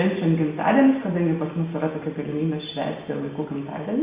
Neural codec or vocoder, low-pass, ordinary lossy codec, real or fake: none; 3.6 kHz; Opus, 64 kbps; real